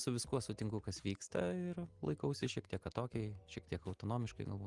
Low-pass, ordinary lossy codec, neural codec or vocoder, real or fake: 10.8 kHz; Opus, 24 kbps; none; real